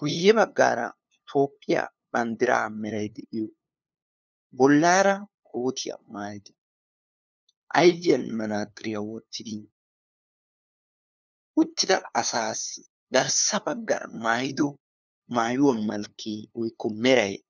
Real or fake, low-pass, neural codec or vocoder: fake; 7.2 kHz; codec, 16 kHz, 2 kbps, FunCodec, trained on LibriTTS, 25 frames a second